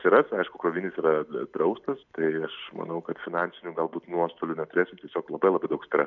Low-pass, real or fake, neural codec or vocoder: 7.2 kHz; real; none